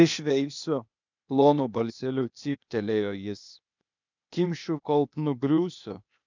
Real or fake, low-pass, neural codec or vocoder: fake; 7.2 kHz; codec, 16 kHz, 0.8 kbps, ZipCodec